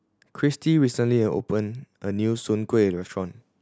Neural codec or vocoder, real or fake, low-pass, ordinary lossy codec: none; real; none; none